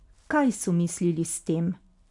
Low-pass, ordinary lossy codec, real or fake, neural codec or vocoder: 10.8 kHz; none; real; none